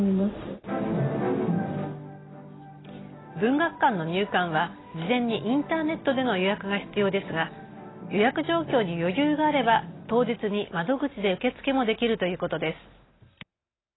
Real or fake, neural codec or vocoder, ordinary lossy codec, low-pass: real; none; AAC, 16 kbps; 7.2 kHz